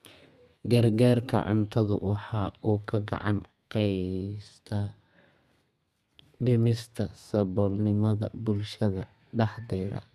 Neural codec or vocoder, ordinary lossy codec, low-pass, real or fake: codec, 32 kHz, 1.9 kbps, SNAC; none; 14.4 kHz; fake